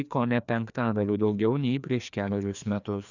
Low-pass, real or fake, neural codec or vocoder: 7.2 kHz; fake; codec, 16 kHz, 2 kbps, FreqCodec, larger model